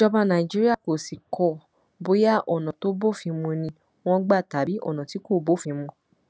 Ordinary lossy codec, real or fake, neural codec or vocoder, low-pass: none; real; none; none